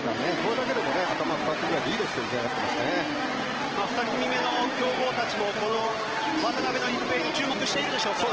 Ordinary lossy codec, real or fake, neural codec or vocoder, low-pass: Opus, 16 kbps; real; none; 7.2 kHz